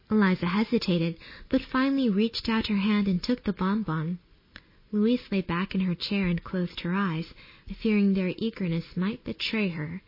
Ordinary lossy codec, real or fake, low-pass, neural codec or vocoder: MP3, 24 kbps; real; 5.4 kHz; none